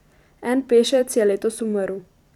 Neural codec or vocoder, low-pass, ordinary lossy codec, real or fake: vocoder, 44.1 kHz, 128 mel bands every 256 samples, BigVGAN v2; 19.8 kHz; none; fake